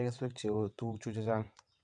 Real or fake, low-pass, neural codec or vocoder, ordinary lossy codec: fake; 9.9 kHz; vocoder, 22.05 kHz, 80 mel bands, WaveNeXt; none